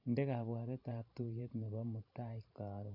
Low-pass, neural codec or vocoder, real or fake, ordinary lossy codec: 5.4 kHz; vocoder, 44.1 kHz, 128 mel bands every 512 samples, BigVGAN v2; fake; AAC, 48 kbps